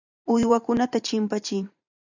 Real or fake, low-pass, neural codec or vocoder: fake; 7.2 kHz; vocoder, 44.1 kHz, 128 mel bands every 512 samples, BigVGAN v2